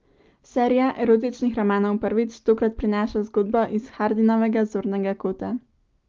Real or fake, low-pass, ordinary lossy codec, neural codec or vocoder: real; 7.2 kHz; Opus, 32 kbps; none